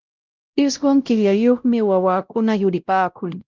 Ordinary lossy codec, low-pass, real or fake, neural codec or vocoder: Opus, 32 kbps; 7.2 kHz; fake; codec, 16 kHz, 0.5 kbps, X-Codec, WavLM features, trained on Multilingual LibriSpeech